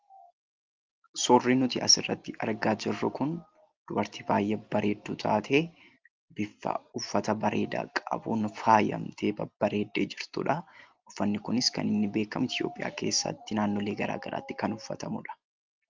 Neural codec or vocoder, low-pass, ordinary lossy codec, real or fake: none; 7.2 kHz; Opus, 24 kbps; real